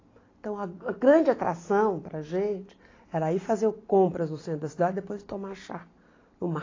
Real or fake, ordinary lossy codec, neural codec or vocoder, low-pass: real; AAC, 32 kbps; none; 7.2 kHz